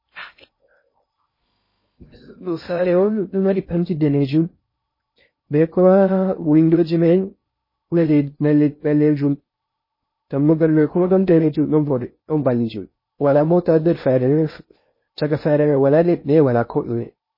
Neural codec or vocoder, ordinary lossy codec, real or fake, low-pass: codec, 16 kHz in and 24 kHz out, 0.6 kbps, FocalCodec, streaming, 2048 codes; MP3, 24 kbps; fake; 5.4 kHz